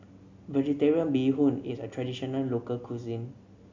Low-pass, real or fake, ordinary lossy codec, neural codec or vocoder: 7.2 kHz; real; MP3, 48 kbps; none